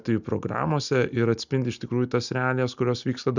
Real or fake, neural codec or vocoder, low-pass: real; none; 7.2 kHz